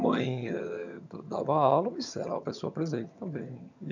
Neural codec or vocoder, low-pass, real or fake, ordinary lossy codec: vocoder, 22.05 kHz, 80 mel bands, HiFi-GAN; 7.2 kHz; fake; none